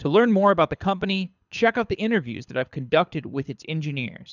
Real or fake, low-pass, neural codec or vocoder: fake; 7.2 kHz; codec, 24 kHz, 6 kbps, HILCodec